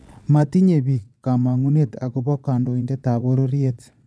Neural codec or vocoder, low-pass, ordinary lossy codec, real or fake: vocoder, 22.05 kHz, 80 mel bands, Vocos; none; none; fake